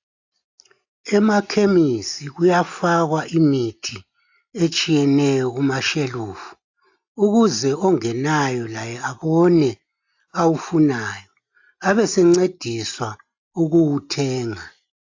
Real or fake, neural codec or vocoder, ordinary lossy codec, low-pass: real; none; AAC, 48 kbps; 7.2 kHz